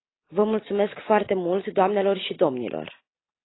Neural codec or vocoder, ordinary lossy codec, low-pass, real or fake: none; AAC, 16 kbps; 7.2 kHz; real